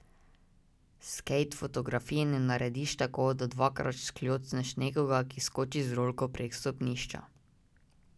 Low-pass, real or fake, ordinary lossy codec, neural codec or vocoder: none; real; none; none